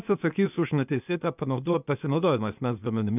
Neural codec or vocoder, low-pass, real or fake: codec, 16 kHz, 0.8 kbps, ZipCodec; 3.6 kHz; fake